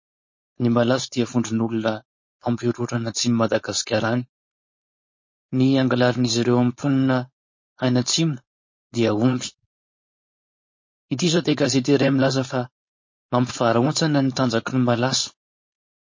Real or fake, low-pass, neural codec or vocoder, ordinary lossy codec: fake; 7.2 kHz; codec, 16 kHz, 4.8 kbps, FACodec; MP3, 32 kbps